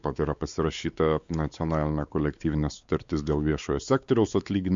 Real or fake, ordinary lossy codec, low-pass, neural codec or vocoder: fake; Opus, 64 kbps; 7.2 kHz; codec, 16 kHz, 4 kbps, X-Codec, WavLM features, trained on Multilingual LibriSpeech